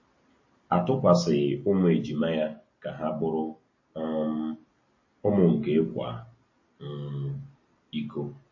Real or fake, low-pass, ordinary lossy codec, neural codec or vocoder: real; 7.2 kHz; MP3, 32 kbps; none